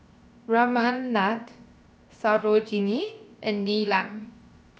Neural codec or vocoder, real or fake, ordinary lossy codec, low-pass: codec, 16 kHz, 0.7 kbps, FocalCodec; fake; none; none